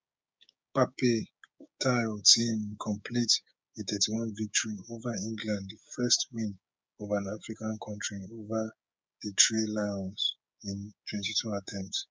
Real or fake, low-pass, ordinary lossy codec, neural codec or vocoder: fake; none; none; codec, 16 kHz, 6 kbps, DAC